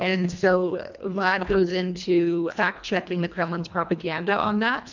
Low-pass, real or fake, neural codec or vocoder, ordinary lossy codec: 7.2 kHz; fake; codec, 24 kHz, 1.5 kbps, HILCodec; MP3, 64 kbps